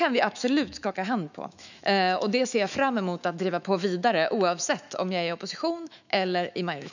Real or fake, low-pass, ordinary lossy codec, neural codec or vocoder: fake; 7.2 kHz; none; autoencoder, 48 kHz, 128 numbers a frame, DAC-VAE, trained on Japanese speech